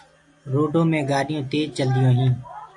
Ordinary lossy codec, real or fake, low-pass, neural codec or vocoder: AAC, 64 kbps; real; 10.8 kHz; none